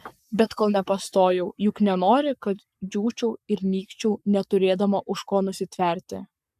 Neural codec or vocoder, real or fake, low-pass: codec, 44.1 kHz, 7.8 kbps, Pupu-Codec; fake; 14.4 kHz